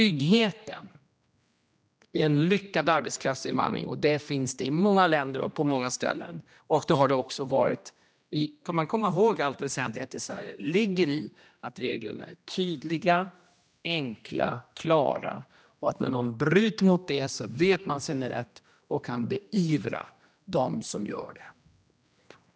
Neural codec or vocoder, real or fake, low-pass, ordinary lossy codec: codec, 16 kHz, 1 kbps, X-Codec, HuBERT features, trained on general audio; fake; none; none